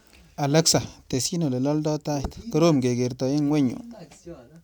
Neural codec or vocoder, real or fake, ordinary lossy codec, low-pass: none; real; none; none